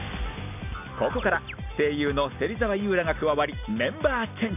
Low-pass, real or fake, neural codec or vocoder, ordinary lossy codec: 3.6 kHz; real; none; none